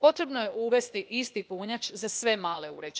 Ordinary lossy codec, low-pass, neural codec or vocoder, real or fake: none; none; codec, 16 kHz, 0.8 kbps, ZipCodec; fake